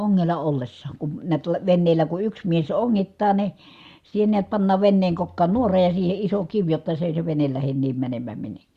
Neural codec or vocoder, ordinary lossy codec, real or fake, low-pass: none; Opus, 64 kbps; real; 14.4 kHz